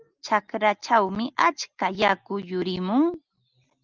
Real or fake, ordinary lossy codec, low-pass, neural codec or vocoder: real; Opus, 24 kbps; 7.2 kHz; none